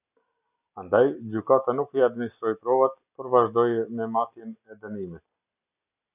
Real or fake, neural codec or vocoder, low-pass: real; none; 3.6 kHz